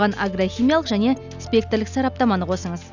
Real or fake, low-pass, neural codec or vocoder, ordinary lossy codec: real; 7.2 kHz; none; none